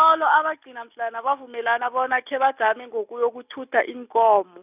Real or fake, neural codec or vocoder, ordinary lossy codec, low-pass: real; none; none; 3.6 kHz